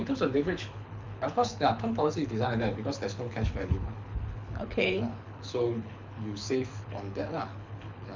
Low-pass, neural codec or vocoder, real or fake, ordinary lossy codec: 7.2 kHz; codec, 24 kHz, 6 kbps, HILCodec; fake; MP3, 64 kbps